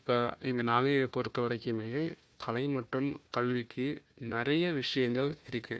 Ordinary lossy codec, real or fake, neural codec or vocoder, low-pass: none; fake; codec, 16 kHz, 1 kbps, FunCodec, trained on Chinese and English, 50 frames a second; none